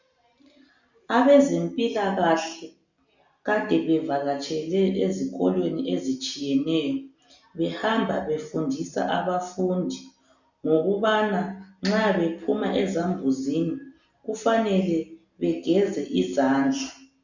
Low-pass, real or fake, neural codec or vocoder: 7.2 kHz; real; none